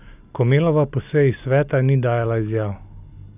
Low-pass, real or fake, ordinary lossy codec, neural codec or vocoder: 3.6 kHz; real; none; none